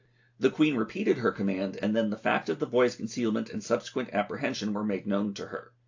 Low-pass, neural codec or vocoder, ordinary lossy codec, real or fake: 7.2 kHz; none; AAC, 48 kbps; real